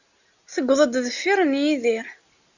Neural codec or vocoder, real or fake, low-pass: none; real; 7.2 kHz